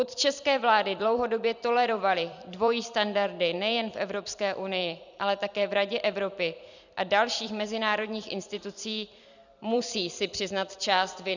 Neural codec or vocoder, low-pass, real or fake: vocoder, 44.1 kHz, 128 mel bands every 256 samples, BigVGAN v2; 7.2 kHz; fake